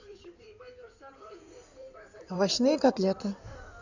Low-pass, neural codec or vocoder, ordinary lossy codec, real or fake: 7.2 kHz; codec, 16 kHz in and 24 kHz out, 2.2 kbps, FireRedTTS-2 codec; none; fake